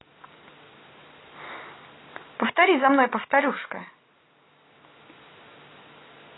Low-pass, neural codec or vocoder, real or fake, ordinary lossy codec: 7.2 kHz; none; real; AAC, 16 kbps